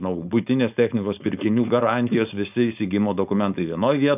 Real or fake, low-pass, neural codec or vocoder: fake; 3.6 kHz; codec, 16 kHz, 4.8 kbps, FACodec